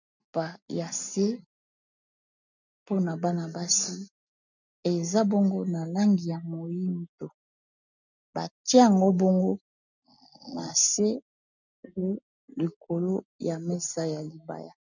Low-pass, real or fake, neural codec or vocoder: 7.2 kHz; real; none